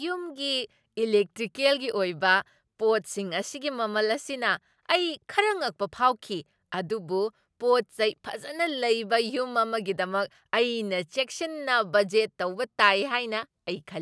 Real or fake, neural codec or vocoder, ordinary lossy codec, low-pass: real; none; none; none